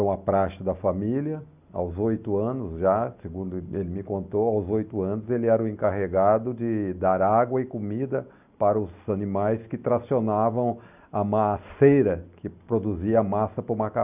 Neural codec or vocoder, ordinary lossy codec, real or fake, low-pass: none; none; real; 3.6 kHz